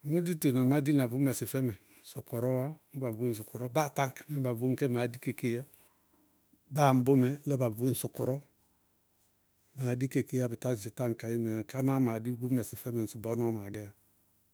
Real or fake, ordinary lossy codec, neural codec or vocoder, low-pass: fake; none; autoencoder, 48 kHz, 32 numbers a frame, DAC-VAE, trained on Japanese speech; none